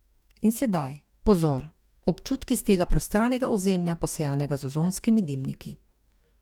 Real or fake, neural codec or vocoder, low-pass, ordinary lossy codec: fake; codec, 44.1 kHz, 2.6 kbps, DAC; 19.8 kHz; none